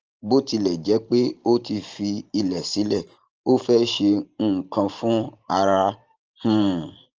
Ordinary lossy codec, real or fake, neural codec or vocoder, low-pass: Opus, 24 kbps; fake; vocoder, 44.1 kHz, 128 mel bands every 512 samples, BigVGAN v2; 7.2 kHz